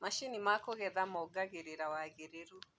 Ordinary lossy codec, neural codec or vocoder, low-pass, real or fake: none; none; none; real